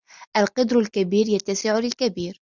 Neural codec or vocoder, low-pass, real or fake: none; 7.2 kHz; real